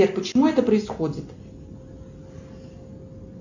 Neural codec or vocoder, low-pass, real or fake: none; 7.2 kHz; real